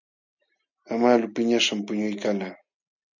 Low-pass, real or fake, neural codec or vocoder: 7.2 kHz; real; none